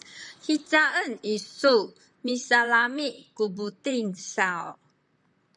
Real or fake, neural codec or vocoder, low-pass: fake; vocoder, 44.1 kHz, 128 mel bands, Pupu-Vocoder; 10.8 kHz